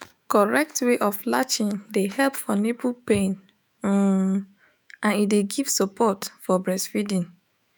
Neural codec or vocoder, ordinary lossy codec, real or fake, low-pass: autoencoder, 48 kHz, 128 numbers a frame, DAC-VAE, trained on Japanese speech; none; fake; none